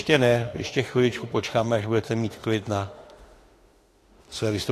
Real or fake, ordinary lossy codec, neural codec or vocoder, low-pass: fake; AAC, 48 kbps; autoencoder, 48 kHz, 32 numbers a frame, DAC-VAE, trained on Japanese speech; 14.4 kHz